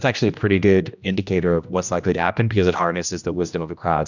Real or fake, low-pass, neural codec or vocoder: fake; 7.2 kHz; codec, 16 kHz, 1 kbps, X-Codec, HuBERT features, trained on general audio